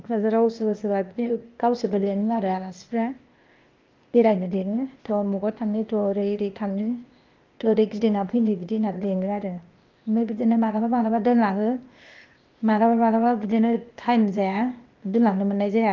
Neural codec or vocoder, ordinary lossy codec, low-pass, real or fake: codec, 16 kHz, 0.8 kbps, ZipCodec; Opus, 24 kbps; 7.2 kHz; fake